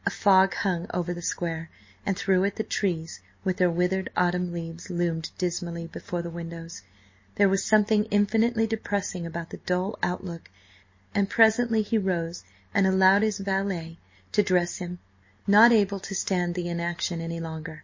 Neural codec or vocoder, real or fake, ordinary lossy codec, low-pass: none; real; MP3, 32 kbps; 7.2 kHz